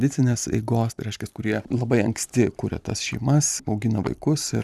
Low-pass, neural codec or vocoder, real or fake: 14.4 kHz; vocoder, 44.1 kHz, 128 mel bands every 256 samples, BigVGAN v2; fake